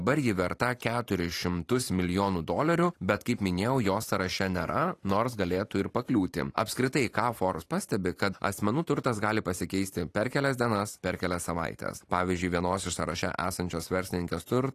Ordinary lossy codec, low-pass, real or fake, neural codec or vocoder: AAC, 48 kbps; 14.4 kHz; fake; vocoder, 44.1 kHz, 128 mel bands every 512 samples, BigVGAN v2